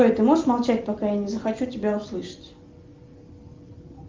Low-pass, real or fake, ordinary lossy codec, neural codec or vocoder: 7.2 kHz; real; Opus, 32 kbps; none